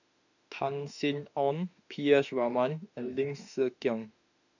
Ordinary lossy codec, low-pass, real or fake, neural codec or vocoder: none; 7.2 kHz; fake; autoencoder, 48 kHz, 32 numbers a frame, DAC-VAE, trained on Japanese speech